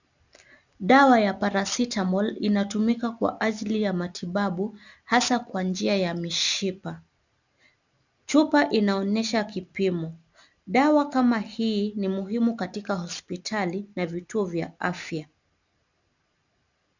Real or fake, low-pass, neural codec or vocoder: real; 7.2 kHz; none